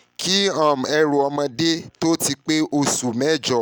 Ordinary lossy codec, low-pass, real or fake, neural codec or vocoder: none; none; real; none